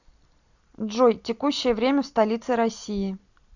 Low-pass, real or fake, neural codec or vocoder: 7.2 kHz; real; none